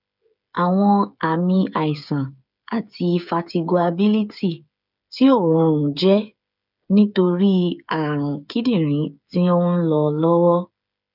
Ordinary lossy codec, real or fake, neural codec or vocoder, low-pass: none; fake; codec, 16 kHz, 8 kbps, FreqCodec, smaller model; 5.4 kHz